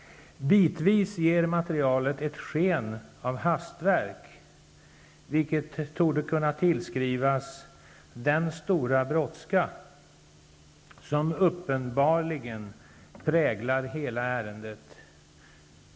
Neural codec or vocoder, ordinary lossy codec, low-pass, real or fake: none; none; none; real